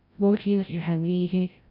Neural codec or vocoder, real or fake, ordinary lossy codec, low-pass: codec, 16 kHz, 0.5 kbps, FreqCodec, larger model; fake; none; 5.4 kHz